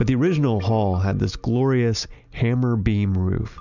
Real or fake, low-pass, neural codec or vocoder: real; 7.2 kHz; none